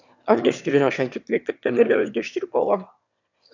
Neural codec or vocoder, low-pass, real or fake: autoencoder, 22.05 kHz, a latent of 192 numbers a frame, VITS, trained on one speaker; 7.2 kHz; fake